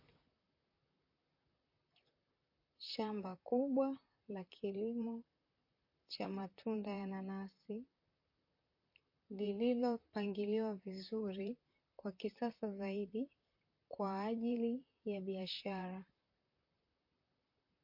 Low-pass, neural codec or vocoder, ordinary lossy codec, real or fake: 5.4 kHz; vocoder, 44.1 kHz, 128 mel bands, Pupu-Vocoder; MP3, 32 kbps; fake